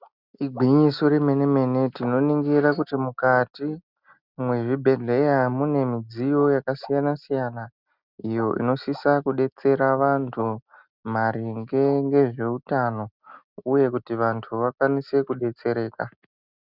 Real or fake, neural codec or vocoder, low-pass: real; none; 5.4 kHz